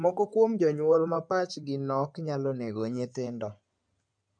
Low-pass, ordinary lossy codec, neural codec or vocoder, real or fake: 9.9 kHz; MP3, 96 kbps; codec, 16 kHz in and 24 kHz out, 2.2 kbps, FireRedTTS-2 codec; fake